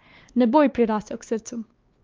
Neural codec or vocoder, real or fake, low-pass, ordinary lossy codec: codec, 16 kHz, 2 kbps, X-Codec, WavLM features, trained on Multilingual LibriSpeech; fake; 7.2 kHz; Opus, 24 kbps